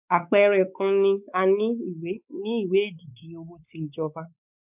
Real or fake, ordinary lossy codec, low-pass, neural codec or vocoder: fake; none; 3.6 kHz; codec, 16 kHz, 4 kbps, X-Codec, WavLM features, trained on Multilingual LibriSpeech